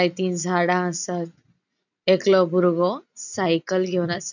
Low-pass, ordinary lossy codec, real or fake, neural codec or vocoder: 7.2 kHz; none; real; none